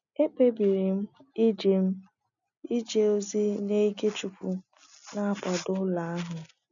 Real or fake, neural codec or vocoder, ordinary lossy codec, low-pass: real; none; MP3, 96 kbps; 7.2 kHz